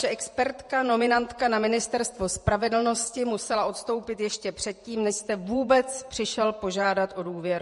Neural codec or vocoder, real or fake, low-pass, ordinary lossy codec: vocoder, 48 kHz, 128 mel bands, Vocos; fake; 14.4 kHz; MP3, 48 kbps